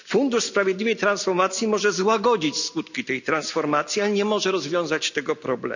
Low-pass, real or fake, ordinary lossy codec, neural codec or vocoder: 7.2 kHz; real; none; none